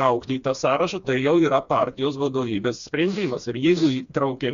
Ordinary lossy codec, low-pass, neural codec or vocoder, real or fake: Opus, 64 kbps; 7.2 kHz; codec, 16 kHz, 2 kbps, FreqCodec, smaller model; fake